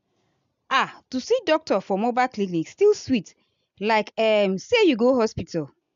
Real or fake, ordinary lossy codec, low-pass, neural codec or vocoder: real; AAC, 96 kbps; 7.2 kHz; none